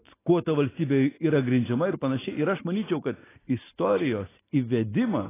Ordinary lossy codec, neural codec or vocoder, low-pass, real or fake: AAC, 16 kbps; none; 3.6 kHz; real